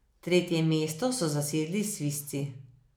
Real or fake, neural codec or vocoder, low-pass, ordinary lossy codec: real; none; none; none